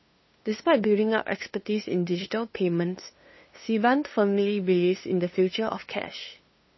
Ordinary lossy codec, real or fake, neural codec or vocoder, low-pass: MP3, 24 kbps; fake; codec, 16 kHz, 2 kbps, FunCodec, trained on LibriTTS, 25 frames a second; 7.2 kHz